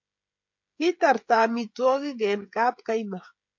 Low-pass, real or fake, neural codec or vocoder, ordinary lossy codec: 7.2 kHz; fake; codec, 16 kHz, 16 kbps, FreqCodec, smaller model; MP3, 48 kbps